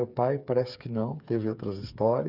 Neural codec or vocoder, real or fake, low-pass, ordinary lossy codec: codec, 16 kHz, 8 kbps, FreqCodec, smaller model; fake; 5.4 kHz; none